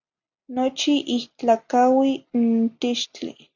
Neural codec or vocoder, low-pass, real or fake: none; 7.2 kHz; real